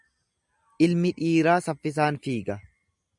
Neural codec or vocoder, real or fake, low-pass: none; real; 10.8 kHz